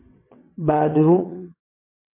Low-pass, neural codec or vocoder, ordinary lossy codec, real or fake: 3.6 kHz; vocoder, 22.05 kHz, 80 mel bands, WaveNeXt; MP3, 24 kbps; fake